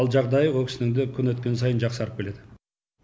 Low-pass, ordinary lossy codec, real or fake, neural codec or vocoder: none; none; real; none